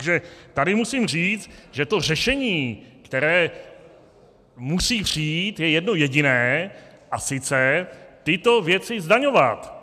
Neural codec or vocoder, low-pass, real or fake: none; 14.4 kHz; real